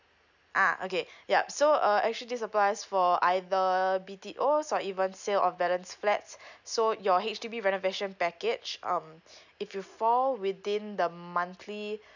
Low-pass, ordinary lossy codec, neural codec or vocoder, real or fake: 7.2 kHz; none; none; real